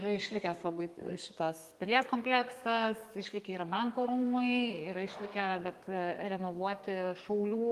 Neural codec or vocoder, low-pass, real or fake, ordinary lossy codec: codec, 32 kHz, 1.9 kbps, SNAC; 14.4 kHz; fake; Opus, 32 kbps